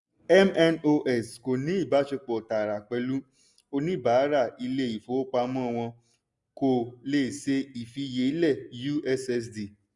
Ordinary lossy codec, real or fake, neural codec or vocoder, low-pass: none; real; none; 10.8 kHz